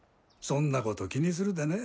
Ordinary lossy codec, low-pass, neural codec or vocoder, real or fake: none; none; none; real